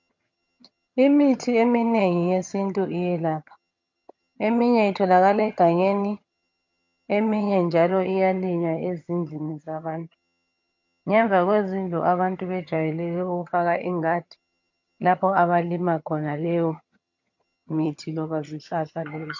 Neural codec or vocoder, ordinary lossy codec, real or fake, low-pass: vocoder, 22.05 kHz, 80 mel bands, HiFi-GAN; MP3, 48 kbps; fake; 7.2 kHz